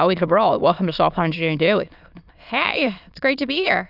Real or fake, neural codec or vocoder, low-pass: fake; autoencoder, 22.05 kHz, a latent of 192 numbers a frame, VITS, trained on many speakers; 5.4 kHz